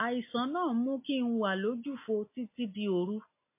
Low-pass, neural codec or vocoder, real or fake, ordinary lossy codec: 3.6 kHz; none; real; MP3, 24 kbps